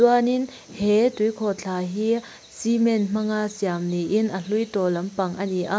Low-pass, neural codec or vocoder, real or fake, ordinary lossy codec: none; none; real; none